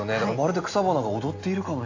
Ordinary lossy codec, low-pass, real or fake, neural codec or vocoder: AAC, 48 kbps; 7.2 kHz; real; none